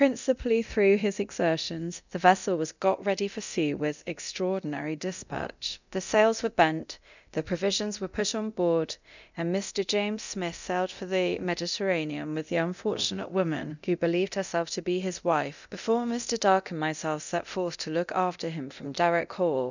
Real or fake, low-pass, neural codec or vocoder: fake; 7.2 kHz; codec, 24 kHz, 0.9 kbps, DualCodec